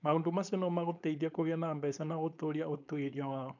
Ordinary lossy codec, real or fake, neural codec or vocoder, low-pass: none; fake; codec, 16 kHz, 4.8 kbps, FACodec; 7.2 kHz